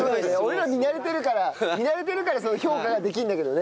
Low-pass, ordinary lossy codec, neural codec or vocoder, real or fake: none; none; none; real